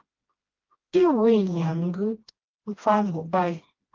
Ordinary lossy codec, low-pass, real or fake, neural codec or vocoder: Opus, 32 kbps; 7.2 kHz; fake; codec, 16 kHz, 1 kbps, FreqCodec, smaller model